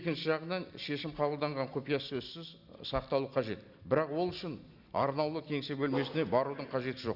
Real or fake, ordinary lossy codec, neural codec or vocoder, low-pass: real; Opus, 64 kbps; none; 5.4 kHz